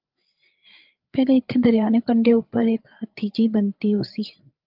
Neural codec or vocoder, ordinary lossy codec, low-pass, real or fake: codec, 16 kHz, 8 kbps, FreqCodec, larger model; Opus, 32 kbps; 5.4 kHz; fake